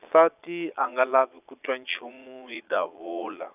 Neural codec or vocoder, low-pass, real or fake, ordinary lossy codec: vocoder, 22.05 kHz, 80 mel bands, Vocos; 3.6 kHz; fake; none